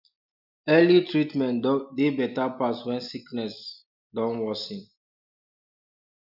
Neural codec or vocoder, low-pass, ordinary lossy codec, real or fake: none; 5.4 kHz; none; real